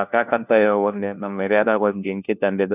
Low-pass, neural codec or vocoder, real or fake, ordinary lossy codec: 3.6 kHz; codec, 16 kHz, 1 kbps, FunCodec, trained on LibriTTS, 50 frames a second; fake; none